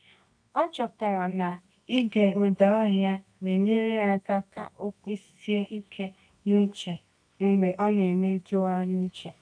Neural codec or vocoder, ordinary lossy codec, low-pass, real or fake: codec, 24 kHz, 0.9 kbps, WavTokenizer, medium music audio release; none; 9.9 kHz; fake